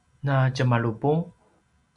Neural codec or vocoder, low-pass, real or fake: none; 10.8 kHz; real